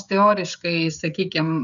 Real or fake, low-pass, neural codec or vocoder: fake; 7.2 kHz; codec, 16 kHz, 16 kbps, FreqCodec, smaller model